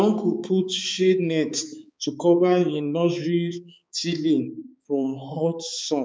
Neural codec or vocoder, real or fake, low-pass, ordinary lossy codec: codec, 16 kHz, 4 kbps, X-Codec, HuBERT features, trained on balanced general audio; fake; none; none